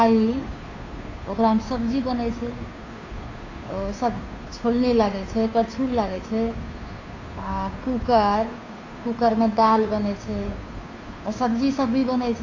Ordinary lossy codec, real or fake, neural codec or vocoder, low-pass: AAC, 48 kbps; fake; codec, 16 kHz, 2 kbps, FunCodec, trained on Chinese and English, 25 frames a second; 7.2 kHz